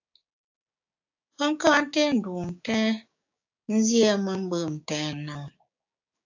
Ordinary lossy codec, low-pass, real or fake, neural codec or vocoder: AAC, 48 kbps; 7.2 kHz; fake; codec, 16 kHz, 6 kbps, DAC